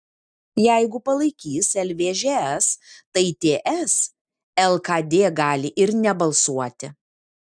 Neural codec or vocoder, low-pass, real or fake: none; 9.9 kHz; real